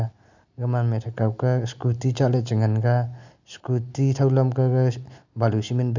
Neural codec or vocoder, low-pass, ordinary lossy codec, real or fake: none; 7.2 kHz; none; real